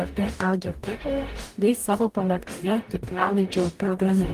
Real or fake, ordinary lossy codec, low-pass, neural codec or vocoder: fake; Opus, 24 kbps; 14.4 kHz; codec, 44.1 kHz, 0.9 kbps, DAC